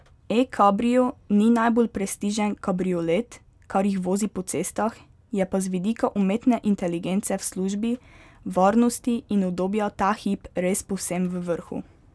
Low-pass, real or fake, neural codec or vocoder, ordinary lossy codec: none; real; none; none